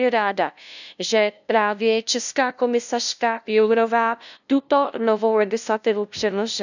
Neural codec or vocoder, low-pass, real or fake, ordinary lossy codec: codec, 16 kHz, 0.5 kbps, FunCodec, trained on LibriTTS, 25 frames a second; 7.2 kHz; fake; none